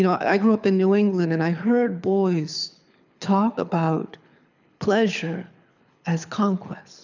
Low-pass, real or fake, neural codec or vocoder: 7.2 kHz; fake; codec, 24 kHz, 6 kbps, HILCodec